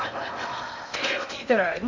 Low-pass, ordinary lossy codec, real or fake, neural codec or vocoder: 7.2 kHz; MP3, 64 kbps; fake; codec, 16 kHz in and 24 kHz out, 0.8 kbps, FocalCodec, streaming, 65536 codes